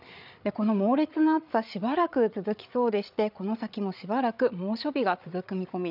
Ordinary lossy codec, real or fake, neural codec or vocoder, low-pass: none; fake; codec, 16 kHz, 8 kbps, FreqCodec, larger model; 5.4 kHz